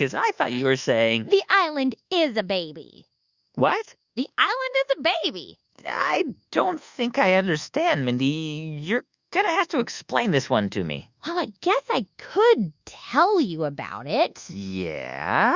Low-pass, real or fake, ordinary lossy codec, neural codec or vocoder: 7.2 kHz; fake; Opus, 64 kbps; codec, 24 kHz, 1.2 kbps, DualCodec